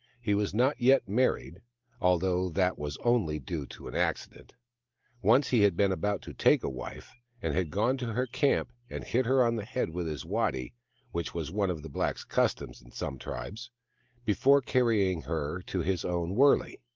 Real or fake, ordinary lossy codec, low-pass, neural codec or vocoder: real; Opus, 32 kbps; 7.2 kHz; none